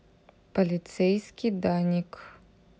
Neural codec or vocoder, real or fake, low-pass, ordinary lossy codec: none; real; none; none